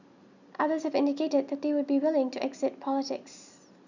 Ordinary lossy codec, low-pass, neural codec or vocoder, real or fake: none; 7.2 kHz; none; real